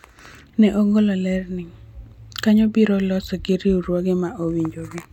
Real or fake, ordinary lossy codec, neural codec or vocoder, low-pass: real; none; none; 19.8 kHz